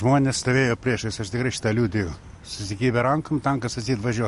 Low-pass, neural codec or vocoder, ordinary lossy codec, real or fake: 14.4 kHz; none; MP3, 48 kbps; real